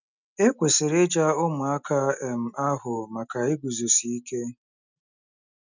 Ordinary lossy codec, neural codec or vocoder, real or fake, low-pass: none; none; real; 7.2 kHz